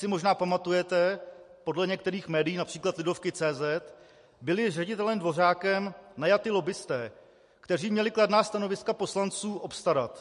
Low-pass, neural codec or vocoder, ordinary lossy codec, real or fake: 10.8 kHz; none; MP3, 48 kbps; real